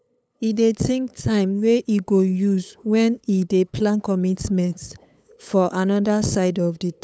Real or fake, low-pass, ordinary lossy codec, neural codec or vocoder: fake; none; none; codec, 16 kHz, 8 kbps, FunCodec, trained on LibriTTS, 25 frames a second